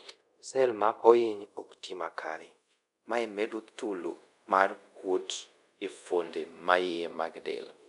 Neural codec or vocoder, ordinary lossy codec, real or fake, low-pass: codec, 24 kHz, 0.5 kbps, DualCodec; none; fake; 10.8 kHz